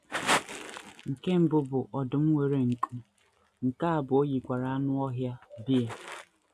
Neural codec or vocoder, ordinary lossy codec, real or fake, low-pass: none; none; real; 14.4 kHz